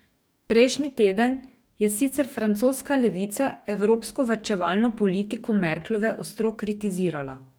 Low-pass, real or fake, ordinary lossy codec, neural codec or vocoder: none; fake; none; codec, 44.1 kHz, 2.6 kbps, DAC